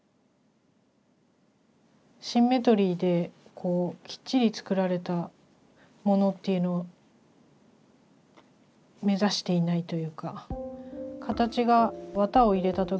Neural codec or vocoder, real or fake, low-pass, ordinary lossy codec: none; real; none; none